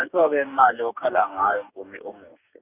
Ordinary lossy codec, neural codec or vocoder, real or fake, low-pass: none; codec, 44.1 kHz, 2.6 kbps, DAC; fake; 3.6 kHz